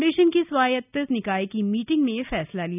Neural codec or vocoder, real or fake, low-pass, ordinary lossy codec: none; real; 3.6 kHz; none